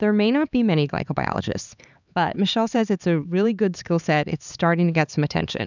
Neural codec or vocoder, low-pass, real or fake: codec, 16 kHz, 4 kbps, X-Codec, HuBERT features, trained on LibriSpeech; 7.2 kHz; fake